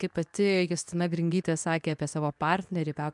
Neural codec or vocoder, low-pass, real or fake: codec, 24 kHz, 0.9 kbps, WavTokenizer, medium speech release version 2; 10.8 kHz; fake